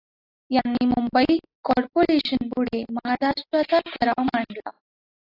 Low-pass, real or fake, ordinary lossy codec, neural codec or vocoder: 5.4 kHz; real; AAC, 32 kbps; none